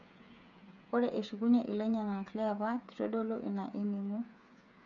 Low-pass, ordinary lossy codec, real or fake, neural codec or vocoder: 7.2 kHz; none; fake; codec, 16 kHz, 8 kbps, FreqCodec, smaller model